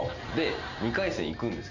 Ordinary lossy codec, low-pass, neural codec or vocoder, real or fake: none; 7.2 kHz; none; real